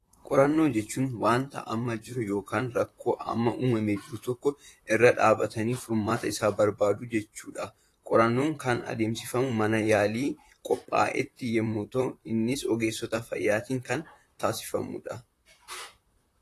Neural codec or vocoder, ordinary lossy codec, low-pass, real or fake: vocoder, 44.1 kHz, 128 mel bands, Pupu-Vocoder; AAC, 48 kbps; 14.4 kHz; fake